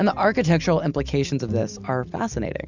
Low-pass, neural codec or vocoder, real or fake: 7.2 kHz; none; real